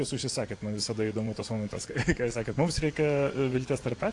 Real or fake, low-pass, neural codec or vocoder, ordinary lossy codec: fake; 10.8 kHz; vocoder, 24 kHz, 100 mel bands, Vocos; AAC, 48 kbps